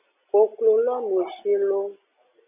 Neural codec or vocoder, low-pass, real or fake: none; 3.6 kHz; real